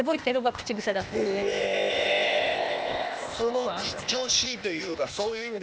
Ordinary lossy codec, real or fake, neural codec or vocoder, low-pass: none; fake; codec, 16 kHz, 0.8 kbps, ZipCodec; none